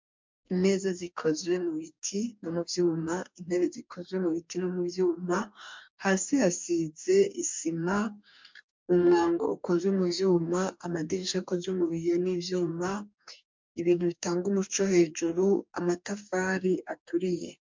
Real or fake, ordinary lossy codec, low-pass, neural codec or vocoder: fake; MP3, 64 kbps; 7.2 kHz; codec, 44.1 kHz, 2.6 kbps, DAC